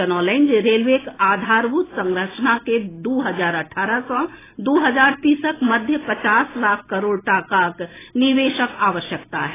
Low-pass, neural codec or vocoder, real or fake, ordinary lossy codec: 3.6 kHz; none; real; AAC, 16 kbps